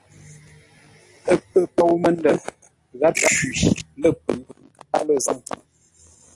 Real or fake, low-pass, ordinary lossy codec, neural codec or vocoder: real; 10.8 kHz; AAC, 48 kbps; none